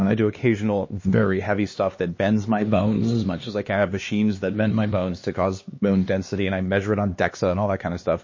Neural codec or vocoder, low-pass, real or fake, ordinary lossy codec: codec, 16 kHz, 2 kbps, X-Codec, HuBERT features, trained on LibriSpeech; 7.2 kHz; fake; MP3, 32 kbps